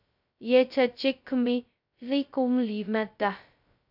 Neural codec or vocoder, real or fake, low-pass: codec, 16 kHz, 0.2 kbps, FocalCodec; fake; 5.4 kHz